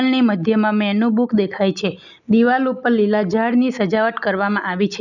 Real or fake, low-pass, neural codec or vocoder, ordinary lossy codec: real; 7.2 kHz; none; none